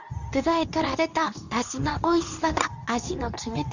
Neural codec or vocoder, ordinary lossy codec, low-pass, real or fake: codec, 24 kHz, 0.9 kbps, WavTokenizer, medium speech release version 2; none; 7.2 kHz; fake